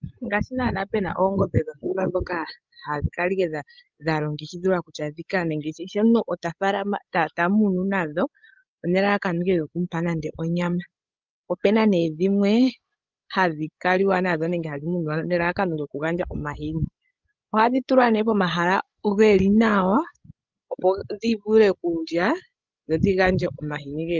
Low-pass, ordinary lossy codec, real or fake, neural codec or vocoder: 7.2 kHz; Opus, 32 kbps; fake; codec, 16 kHz, 16 kbps, FreqCodec, larger model